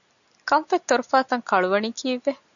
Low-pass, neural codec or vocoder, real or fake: 7.2 kHz; none; real